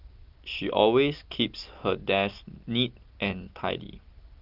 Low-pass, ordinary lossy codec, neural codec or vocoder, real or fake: 5.4 kHz; Opus, 32 kbps; none; real